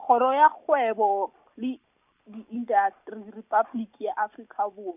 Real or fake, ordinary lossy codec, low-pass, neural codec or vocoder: real; none; 3.6 kHz; none